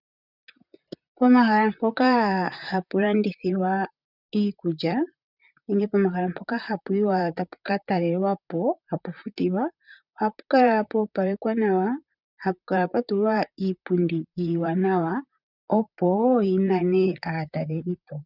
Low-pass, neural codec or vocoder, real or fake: 5.4 kHz; vocoder, 44.1 kHz, 128 mel bands, Pupu-Vocoder; fake